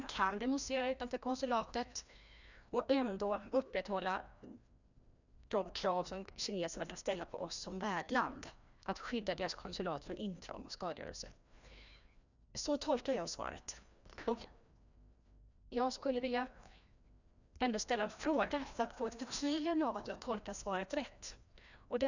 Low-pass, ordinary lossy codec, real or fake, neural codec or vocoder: 7.2 kHz; none; fake; codec, 16 kHz, 1 kbps, FreqCodec, larger model